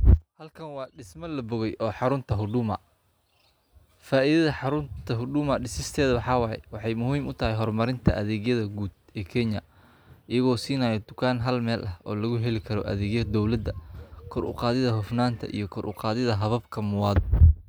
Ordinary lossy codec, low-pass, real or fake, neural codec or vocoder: none; none; real; none